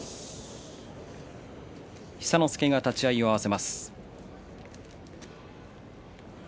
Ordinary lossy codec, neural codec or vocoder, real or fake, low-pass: none; none; real; none